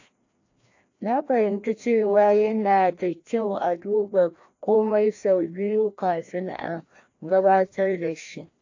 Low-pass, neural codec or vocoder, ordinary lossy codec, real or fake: 7.2 kHz; codec, 16 kHz, 1 kbps, FreqCodec, larger model; AAC, 48 kbps; fake